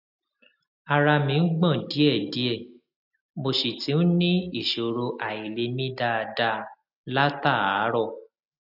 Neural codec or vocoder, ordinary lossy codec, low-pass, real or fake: none; none; 5.4 kHz; real